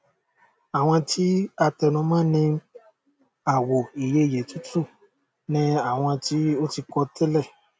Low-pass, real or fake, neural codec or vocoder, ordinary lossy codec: none; real; none; none